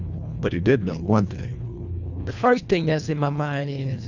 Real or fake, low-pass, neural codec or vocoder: fake; 7.2 kHz; codec, 24 kHz, 1.5 kbps, HILCodec